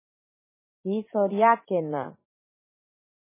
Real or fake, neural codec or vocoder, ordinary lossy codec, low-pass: fake; vocoder, 44.1 kHz, 80 mel bands, Vocos; MP3, 16 kbps; 3.6 kHz